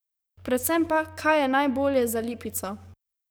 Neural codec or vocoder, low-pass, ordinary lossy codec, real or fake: codec, 44.1 kHz, 7.8 kbps, DAC; none; none; fake